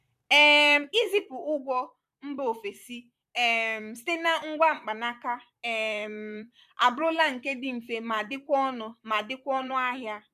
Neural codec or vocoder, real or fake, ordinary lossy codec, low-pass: vocoder, 44.1 kHz, 128 mel bands, Pupu-Vocoder; fake; none; 14.4 kHz